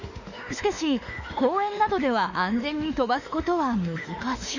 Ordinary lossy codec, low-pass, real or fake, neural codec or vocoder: none; 7.2 kHz; fake; autoencoder, 48 kHz, 32 numbers a frame, DAC-VAE, trained on Japanese speech